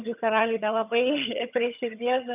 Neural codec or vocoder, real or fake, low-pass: vocoder, 22.05 kHz, 80 mel bands, HiFi-GAN; fake; 3.6 kHz